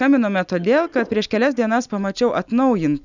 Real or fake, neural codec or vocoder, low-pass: real; none; 7.2 kHz